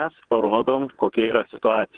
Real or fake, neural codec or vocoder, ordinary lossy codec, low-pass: fake; vocoder, 22.05 kHz, 80 mel bands, WaveNeXt; Opus, 24 kbps; 9.9 kHz